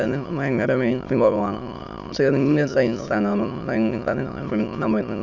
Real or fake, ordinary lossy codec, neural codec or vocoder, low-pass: fake; none; autoencoder, 22.05 kHz, a latent of 192 numbers a frame, VITS, trained on many speakers; 7.2 kHz